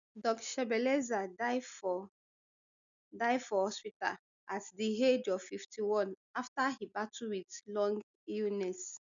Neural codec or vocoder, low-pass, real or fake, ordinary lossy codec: none; 7.2 kHz; real; none